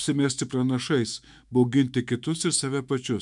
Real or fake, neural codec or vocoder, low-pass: fake; autoencoder, 48 kHz, 128 numbers a frame, DAC-VAE, trained on Japanese speech; 10.8 kHz